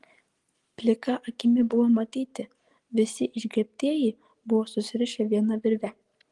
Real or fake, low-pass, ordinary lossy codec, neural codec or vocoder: real; 10.8 kHz; Opus, 24 kbps; none